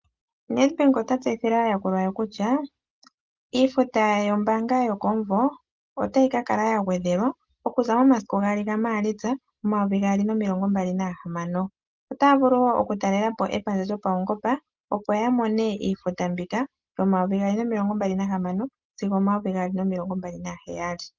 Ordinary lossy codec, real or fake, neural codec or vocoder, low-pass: Opus, 32 kbps; real; none; 7.2 kHz